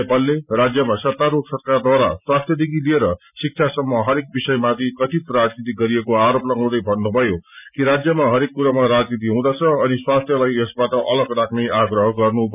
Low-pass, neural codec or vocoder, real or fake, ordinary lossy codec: 3.6 kHz; none; real; none